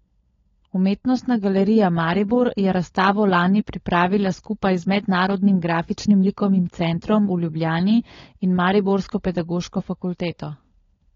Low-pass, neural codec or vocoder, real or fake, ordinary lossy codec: 7.2 kHz; codec, 16 kHz, 16 kbps, FunCodec, trained on LibriTTS, 50 frames a second; fake; AAC, 32 kbps